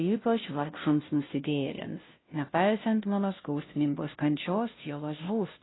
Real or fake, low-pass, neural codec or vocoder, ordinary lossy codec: fake; 7.2 kHz; codec, 16 kHz, 0.5 kbps, FunCodec, trained on Chinese and English, 25 frames a second; AAC, 16 kbps